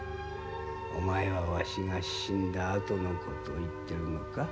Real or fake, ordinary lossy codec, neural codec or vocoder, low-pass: real; none; none; none